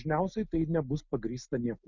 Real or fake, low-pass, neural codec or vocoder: real; 7.2 kHz; none